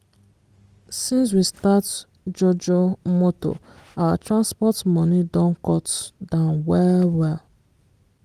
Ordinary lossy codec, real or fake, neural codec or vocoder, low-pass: Opus, 24 kbps; real; none; 14.4 kHz